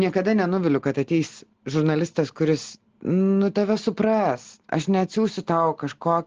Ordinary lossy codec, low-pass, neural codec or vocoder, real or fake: Opus, 16 kbps; 7.2 kHz; none; real